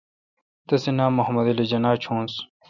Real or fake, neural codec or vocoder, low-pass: real; none; 7.2 kHz